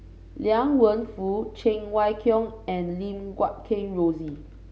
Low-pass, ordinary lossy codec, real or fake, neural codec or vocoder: none; none; real; none